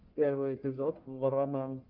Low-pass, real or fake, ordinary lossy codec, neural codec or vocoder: 5.4 kHz; fake; Opus, 24 kbps; codec, 44.1 kHz, 1.7 kbps, Pupu-Codec